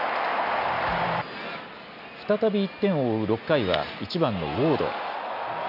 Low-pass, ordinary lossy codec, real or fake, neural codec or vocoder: 5.4 kHz; none; real; none